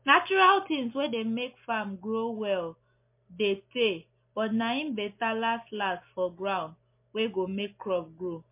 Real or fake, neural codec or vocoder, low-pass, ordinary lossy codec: real; none; 3.6 kHz; MP3, 24 kbps